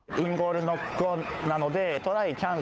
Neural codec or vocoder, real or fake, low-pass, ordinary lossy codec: codec, 16 kHz, 8 kbps, FunCodec, trained on Chinese and English, 25 frames a second; fake; none; none